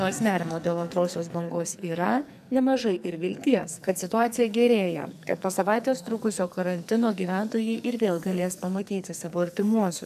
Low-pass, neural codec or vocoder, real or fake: 14.4 kHz; codec, 44.1 kHz, 2.6 kbps, SNAC; fake